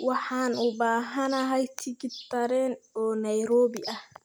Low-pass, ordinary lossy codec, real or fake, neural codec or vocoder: none; none; real; none